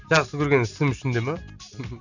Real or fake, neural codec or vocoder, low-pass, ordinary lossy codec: real; none; 7.2 kHz; none